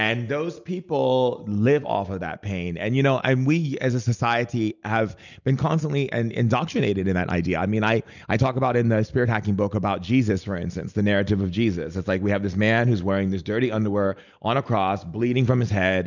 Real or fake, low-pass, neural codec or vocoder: real; 7.2 kHz; none